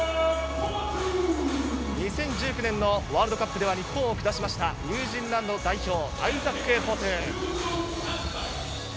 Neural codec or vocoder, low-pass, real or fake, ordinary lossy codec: none; none; real; none